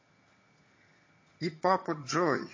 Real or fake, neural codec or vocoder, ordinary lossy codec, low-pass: fake; vocoder, 22.05 kHz, 80 mel bands, HiFi-GAN; MP3, 32 kbps; 7.2 kHz